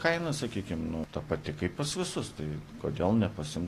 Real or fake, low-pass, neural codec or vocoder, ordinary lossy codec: real; 14.4 kHz; none; AAC, 48 kbps